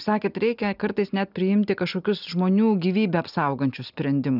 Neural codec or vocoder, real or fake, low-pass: none; real; 5.4 kHz